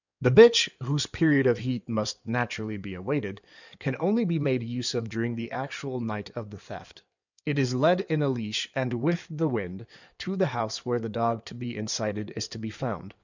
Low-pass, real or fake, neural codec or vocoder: 7.2 kHz; fake; codec, 16 kHz in and 24 kHz out, 2.2 kbps, FireRedTTS-2 codec